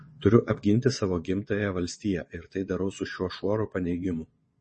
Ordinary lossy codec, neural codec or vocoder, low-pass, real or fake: MP3, 32 kbps; vocoder, 22.05 kHz, 80 mel bands, WaveNeXt; 9.9 kHz; fake